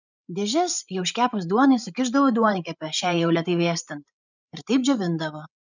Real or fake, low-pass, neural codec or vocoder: fake; 7.2 kHz; codec, 16 kHz, 16 kbps, FreqCodec, larger model